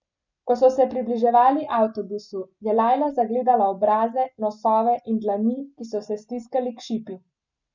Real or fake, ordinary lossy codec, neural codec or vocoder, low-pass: real; none; none; 7.2 kHz